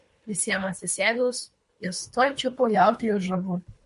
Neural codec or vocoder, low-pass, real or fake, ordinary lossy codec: codec, 24 kHz, 3 kbps, HILCodec; 10.8 kHz; fake; MP3, 48 kbps